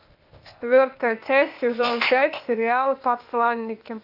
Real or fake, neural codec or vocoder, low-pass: fake; codec, 16 kHz, 0.8 kbps, ZipCodec; 5.4 kHz